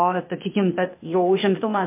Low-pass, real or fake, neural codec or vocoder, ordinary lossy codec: 3.6 kHz; fake; codec, 16 kHz, 0.8 kbps, ZipCodec; MP3, 24 kbps